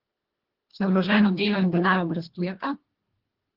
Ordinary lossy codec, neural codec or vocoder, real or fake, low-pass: Opus, 16 kbps; codec, 24 kHz, 1.5 kbps, HILCodec; fake; 5.4 kHz